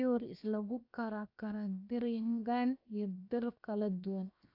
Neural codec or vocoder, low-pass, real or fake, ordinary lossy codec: codec, 16 kHz, 0.7 kbps, FocalCodec; 5.4 kHz; fake; none